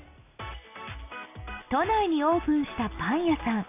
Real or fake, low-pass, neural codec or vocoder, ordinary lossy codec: real; 3.6 kHz; none; AAC, 24 kbps